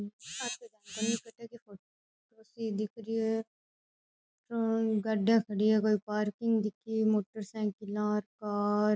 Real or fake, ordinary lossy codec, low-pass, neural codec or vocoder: real; none; none; none